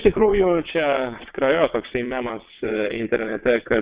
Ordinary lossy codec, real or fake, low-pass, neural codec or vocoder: Opus, 64 kbps; fake; 3.6 kHz; vocoder, 22.05 kHz, 80 mel bands, WaveNeXt